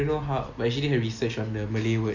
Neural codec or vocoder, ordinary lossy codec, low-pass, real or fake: none; none; 7.2 kHz; real